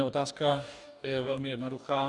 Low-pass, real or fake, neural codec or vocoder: 10.8 kHz; fake; codec, 44.1 kHz, 2.6 kbps, DAC